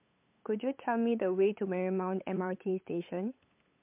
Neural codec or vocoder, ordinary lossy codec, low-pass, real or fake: codec, 16 kHz, 8 kbps, FunCodec, trained on LibriTTS, 25 frames a second; MP3, 32 kbps; 3.6 kHz; fake